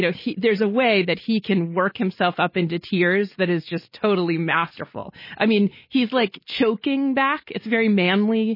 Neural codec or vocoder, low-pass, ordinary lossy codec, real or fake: none; 5.4 kHz; MP3, 24 kbps; real